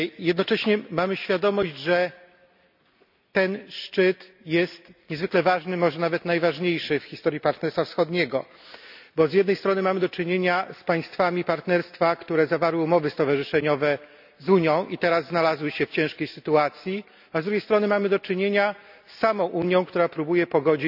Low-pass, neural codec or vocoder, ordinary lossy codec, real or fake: 5.4 kHz; none; none; real